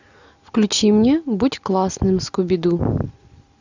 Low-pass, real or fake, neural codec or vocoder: 7.2 kHz; real; none